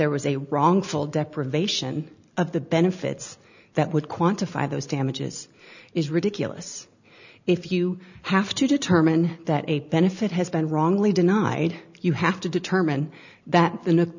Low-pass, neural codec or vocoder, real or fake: 7.2 kHz; none; real